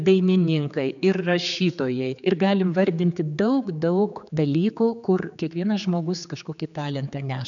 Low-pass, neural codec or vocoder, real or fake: 7.2 kHz; codec, 16 kHz, 4 kbps, X-Codec, HuBERT features, trained on general audio; fake